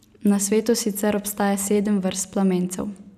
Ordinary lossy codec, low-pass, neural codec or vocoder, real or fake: none; 14.4 kHz; none; real